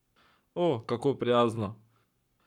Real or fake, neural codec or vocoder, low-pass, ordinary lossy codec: fake; codec, 44.1 kHz, 7.8 kbps, Pupu-Codec; 19.8 kHz; none